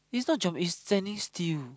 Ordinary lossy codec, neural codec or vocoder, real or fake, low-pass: none; none; real; none